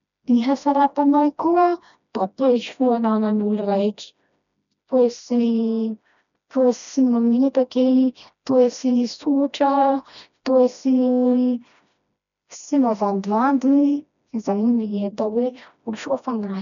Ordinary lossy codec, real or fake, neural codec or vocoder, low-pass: none; fake; codec, 16 kHz, 1 kbps, FreqCodec, smaller model; 7.2 kHz